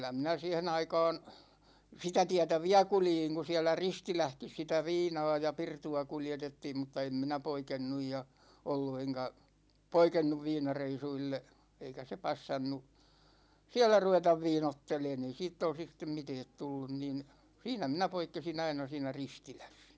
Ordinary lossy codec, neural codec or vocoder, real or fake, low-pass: none; none; real; none